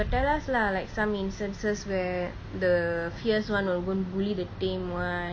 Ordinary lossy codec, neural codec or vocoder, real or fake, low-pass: none; none; real; none